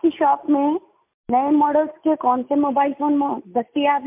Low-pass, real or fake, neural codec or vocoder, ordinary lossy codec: 3.6 kHz; real; none; MP3, 32 kbps